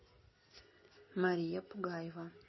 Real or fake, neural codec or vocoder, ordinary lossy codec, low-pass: real; none; MP3, 24 kbps; 7.2 kHz